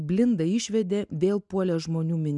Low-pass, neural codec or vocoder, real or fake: 10.8 kHz; none; real